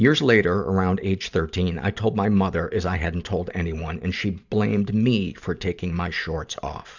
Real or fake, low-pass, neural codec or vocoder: fake; 7.2 kHz; vocoder, 44.1 kHz, 128 mel bands every 512 samples, BigVGAN v2